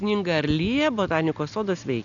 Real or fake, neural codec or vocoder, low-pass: real; none; 7.2 kHz